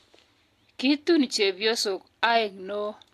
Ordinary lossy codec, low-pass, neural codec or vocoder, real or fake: AAC, 64 kbps; 14.4 kHz; none; real